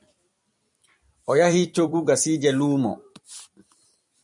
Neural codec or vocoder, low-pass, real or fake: vocoder, 44.1 kHz, 128 mel bands every 256 samples, BigVGAN v2; 10.8 kHz; fake